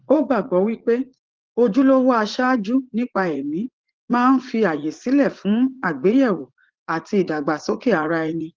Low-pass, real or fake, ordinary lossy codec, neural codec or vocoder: 7.2 kHz; fake; Opus, 16 kbps; vocoder, 44.1 kHz, 80 mel bands, Vocos